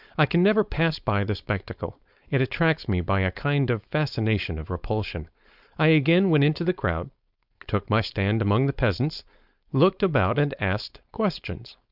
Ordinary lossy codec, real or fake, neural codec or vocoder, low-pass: Opus, 64 kbps; fake; codec, 16 kHz, 4.8 kbps, FACodec; 5.4 kHz